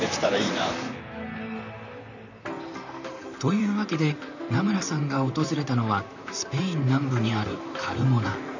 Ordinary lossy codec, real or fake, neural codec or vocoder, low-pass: none; fake; vocoder, 44.1 kHz, 128 mel bands, Pupu-Vocoder; 7.2 kHz